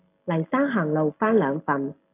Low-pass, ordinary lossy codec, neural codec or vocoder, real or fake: 3.6 kHz; AAC, 24 kbps; none; real